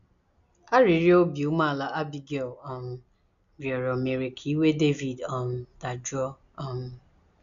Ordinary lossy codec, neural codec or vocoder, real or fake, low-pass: none; none; real; 7.2 kHz